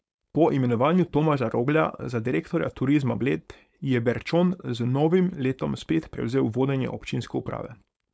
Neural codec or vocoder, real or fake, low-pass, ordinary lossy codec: codec, 16 kHz, 4.8 kbps, FACodec; fake; none; none